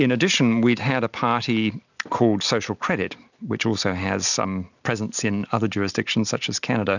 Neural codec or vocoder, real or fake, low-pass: none; real; 7.2 kHz